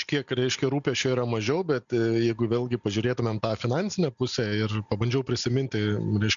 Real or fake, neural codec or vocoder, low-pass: real; none; 7.2 kHz